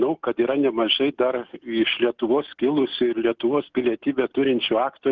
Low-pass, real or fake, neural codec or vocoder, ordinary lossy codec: 7.2 kHz; real; none; Opus, 32 kbps